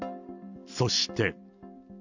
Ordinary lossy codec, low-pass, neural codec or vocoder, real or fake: none; 7.2 kHz; none; real